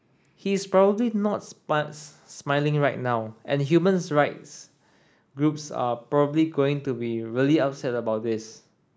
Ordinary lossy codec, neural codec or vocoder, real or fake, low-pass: none; none; real; none